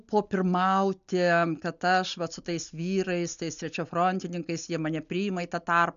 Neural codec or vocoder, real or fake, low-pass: none; real; 7.2 kHz